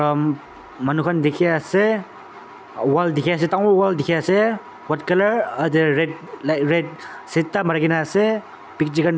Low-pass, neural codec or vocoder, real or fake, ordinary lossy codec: none; none; real; none